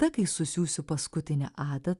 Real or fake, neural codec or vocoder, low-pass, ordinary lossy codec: real; none; 10.8 kHz; Opus, 64 kbps